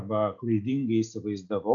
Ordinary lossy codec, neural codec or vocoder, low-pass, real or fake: MP3, 64 kbps; none; 7.2 kHz; real